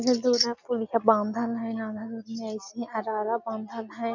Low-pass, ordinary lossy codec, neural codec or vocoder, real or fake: 7.2 kHz; none; none; real